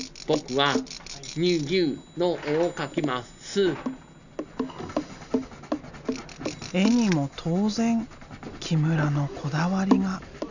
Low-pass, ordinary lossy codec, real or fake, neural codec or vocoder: 7.2 kHz; none; real; none